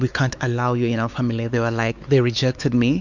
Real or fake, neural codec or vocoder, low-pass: fake; codec, 16 kHz, 4 kbps, X-Codec, WavLM features, trained on Multilingual LibriSpeech; 7.2 kHz